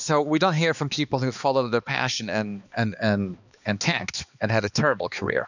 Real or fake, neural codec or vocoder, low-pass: fake; codec, 16 kHz, 2 kbps, X-Codec, HuBERT features, trained on balanced general audio; 7.2 kHz